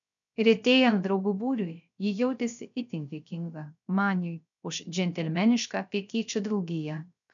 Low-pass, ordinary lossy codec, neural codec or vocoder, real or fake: 7.2 kHz; MP3, 96 kbps; codec, 16 kHz, 0.3 kbps, FocalCodec; fake